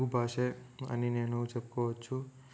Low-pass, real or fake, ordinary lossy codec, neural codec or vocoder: none; real; none; none